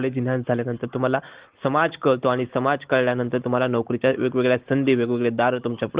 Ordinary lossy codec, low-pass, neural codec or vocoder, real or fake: Opus, 16 kbps; 3.6 kHz; none; real